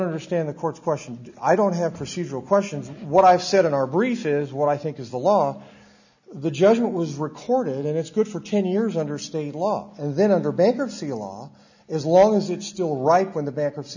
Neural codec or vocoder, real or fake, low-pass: none; real; 7.2 kHz